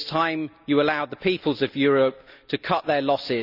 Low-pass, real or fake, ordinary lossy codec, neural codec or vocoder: 5.4 kHz; real; none; none